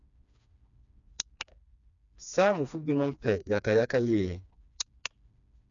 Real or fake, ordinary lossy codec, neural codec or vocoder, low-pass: fake; none; codec, 16 kHz, 2 kbps, FreqCodec, smaller model; 7.2 kHz